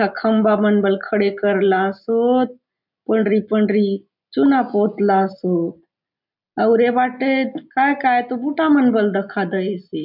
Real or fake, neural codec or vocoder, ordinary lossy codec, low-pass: real; none; none; 5.4 kHz